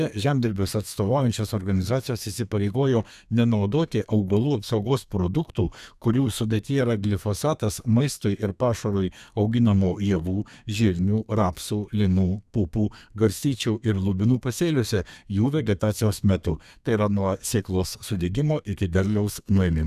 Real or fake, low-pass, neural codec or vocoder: fake; 14.4 kHz; codec, 32 kHz, 1.9 kbps, SNAC